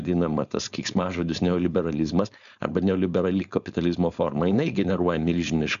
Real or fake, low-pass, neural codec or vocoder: fake; 7.2 kHz; codec, 16 kHz, 4.8 kbps, FACodec